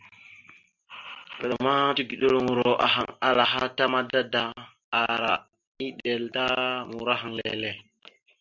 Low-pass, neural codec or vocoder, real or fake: 7.2 kHz; none; real